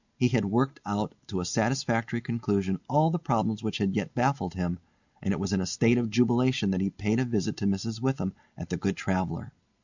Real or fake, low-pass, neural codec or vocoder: real; 7.2 kHz; none